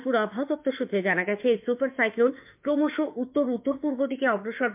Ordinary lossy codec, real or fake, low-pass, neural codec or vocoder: MP3, 32 kbps; fake; 3.6 kHz; autoencoder, 48 kHz, 32 numbers a frame, DAC-VAE, trained on Japanese speech